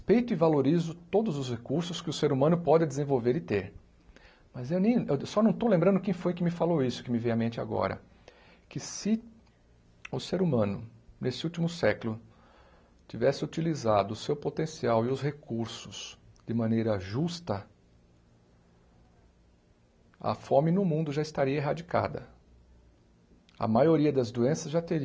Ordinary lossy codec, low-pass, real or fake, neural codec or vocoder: none; none; real; none